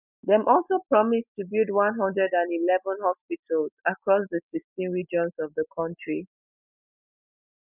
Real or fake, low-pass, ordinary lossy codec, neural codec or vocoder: real; 3.6 kHz; none; none